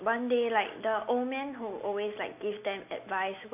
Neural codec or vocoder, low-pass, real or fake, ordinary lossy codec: none; 3.6 kHz; real; AAC, 32 kbps